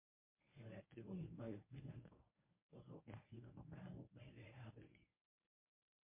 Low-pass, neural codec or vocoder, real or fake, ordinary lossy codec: 3.6 kHz; codec, 24 kHz, 0.9 kbps, WavTokenizer, medium speech release version 1; fake; MP3, 24 kbps